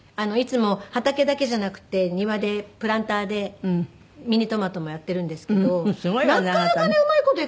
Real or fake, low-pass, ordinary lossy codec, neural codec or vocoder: real; none; none; none